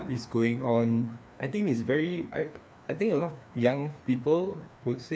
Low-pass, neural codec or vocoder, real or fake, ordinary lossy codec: none; codec, 16 kHz, 2 kbps, FreqCodec, larger model; fake; none